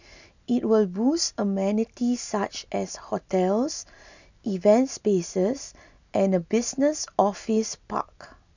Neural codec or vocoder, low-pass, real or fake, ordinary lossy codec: none; 7.2 kHz; real; none